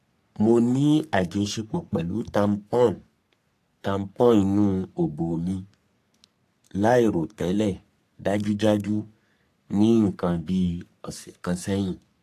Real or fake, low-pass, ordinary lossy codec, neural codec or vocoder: fake; 14.4 kHz; AAC, 64 kbps; codec, 44.1 kHz, 3.4 kbps, Pupu-Codec